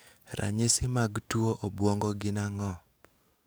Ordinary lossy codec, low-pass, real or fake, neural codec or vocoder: none; none; fake; codec, 44.1 kHz, 7.8 kbps, DAC